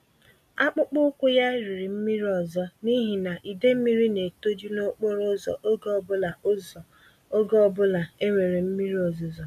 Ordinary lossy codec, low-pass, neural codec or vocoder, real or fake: none; 14.4 kHz; none; real